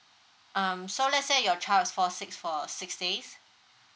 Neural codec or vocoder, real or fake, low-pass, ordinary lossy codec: none; real; none; none